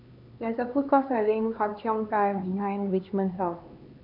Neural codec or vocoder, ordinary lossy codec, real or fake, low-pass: codec, 16 kHz, 2 kbps, X-Codec, HuBERT features, trained on LibriSpeech; MP3, 48 kbps; fake; 5.4 kHz